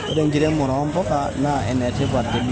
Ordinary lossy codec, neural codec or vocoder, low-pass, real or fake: none; none; none; real